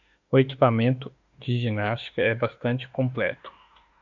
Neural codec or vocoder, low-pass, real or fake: autoencoder, 48 kHz, 32 numbers a frame, DAC-VAE, trained on Japanese speech; 7.2 kHz; fake